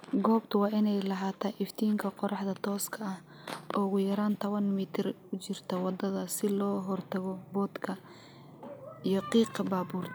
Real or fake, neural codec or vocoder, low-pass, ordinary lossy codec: real; none; none; none